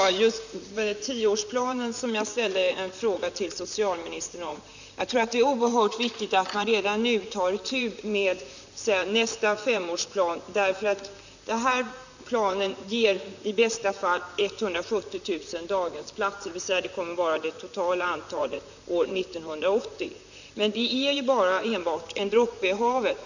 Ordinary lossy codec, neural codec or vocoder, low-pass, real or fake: none; vocoder, 44.1 kHz, 128 mel bands, Pupu-Vocoder; 7.2 kHz; fake